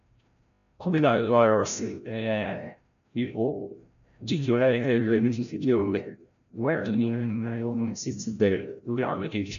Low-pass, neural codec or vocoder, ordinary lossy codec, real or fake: 7.2 kHz; codec, 16 kHz, 0.5 kbps, FreqCodec, larger model; none; fake